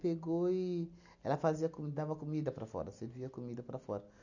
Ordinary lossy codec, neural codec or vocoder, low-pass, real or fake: none; none; 7.2 kHz; real